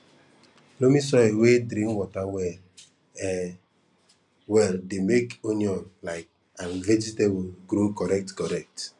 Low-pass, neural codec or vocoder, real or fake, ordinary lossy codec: 10.8 kHz; none; real; none